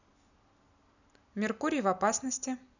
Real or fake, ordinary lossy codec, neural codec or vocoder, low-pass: real; none; none; 7.2 kHz